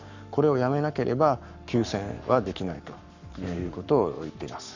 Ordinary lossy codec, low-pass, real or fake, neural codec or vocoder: none; 7.2 kHz; fake; codec, 44.1 kHz, 7.8 kbps, Pupu-Codec